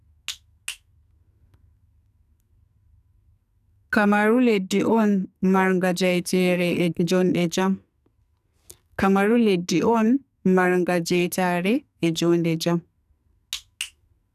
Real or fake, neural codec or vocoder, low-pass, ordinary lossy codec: fake; codec, 44.1 kHz, 2.6 kbps, SNAC; 14.4 kHz; none